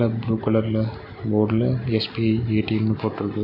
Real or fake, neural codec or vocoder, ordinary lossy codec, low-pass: real; none; none; 5.4 kHz